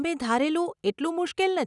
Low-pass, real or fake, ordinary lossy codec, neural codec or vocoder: 10.8 kHz; real; none; none